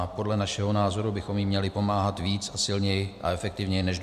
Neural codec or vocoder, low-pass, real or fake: none; 14.4 kHz; real